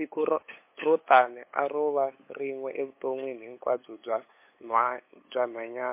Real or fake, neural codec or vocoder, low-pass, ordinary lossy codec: fake; codec, 16 kHz, 8 kbps, FunCodec, trained on LibriTTS, 25 frames a second; 3.6 kHz; MP3, 24 kbps